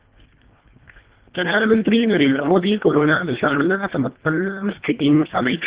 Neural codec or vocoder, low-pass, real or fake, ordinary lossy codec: codec, 24 kHz, 1.5 kbps, HILCodec; 3.6 kHz; fake; Opus, 16 kbps